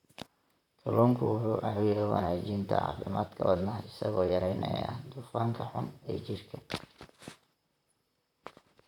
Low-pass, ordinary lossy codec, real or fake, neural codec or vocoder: 19.8 kHz; none; fake; vocoder, 44.1 kHz, 128 mel bands, Pupu-Vocoder